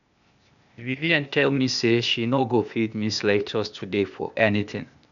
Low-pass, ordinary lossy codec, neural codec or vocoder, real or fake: 7.2 kHz; none; codec, 16 kHz, 0.8 kbps, ZipCodec; fake